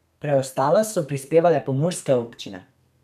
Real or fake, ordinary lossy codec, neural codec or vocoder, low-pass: fake; none; codec, 32 kHz, 1.9 kbps, SNAC; 14.4 kHz